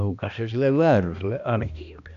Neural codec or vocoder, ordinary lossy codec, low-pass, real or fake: codec, 16 kHz, 1 kbps, X-Codec, HuBERT features, trained on balanced general audio; none; 7.2 kHz; fake